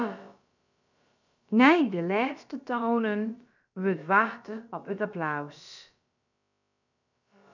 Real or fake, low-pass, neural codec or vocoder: fake; 7.2 kHz; codec, 16 kHz, about 1 kbps, DyCAST, with the encoder's durations